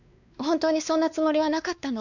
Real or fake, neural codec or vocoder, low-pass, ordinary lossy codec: fake; codec, 16 kHz, 2 kbps, X-Codec, WavLM features, trained on Multilingual LibriSpeech; 7.2 kHz; none